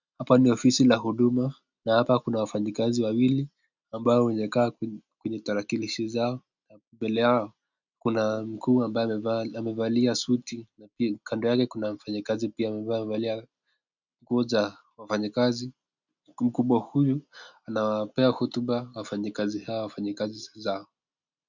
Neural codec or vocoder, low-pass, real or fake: none; 7.2 kHz; real